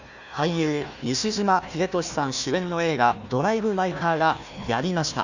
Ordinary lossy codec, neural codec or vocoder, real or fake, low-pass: none; codec, 16 kHz, 1 kbps, FunCodec, trained on Chinese and English, 50 frames a second; fake; 7.2 kHz